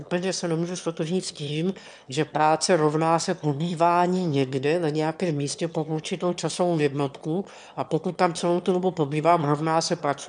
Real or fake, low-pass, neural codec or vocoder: fake; 9.9 kHz; autoencoder, 22.05 kHz, a latent of 192 numbers a frame, VITS, trained on one speaker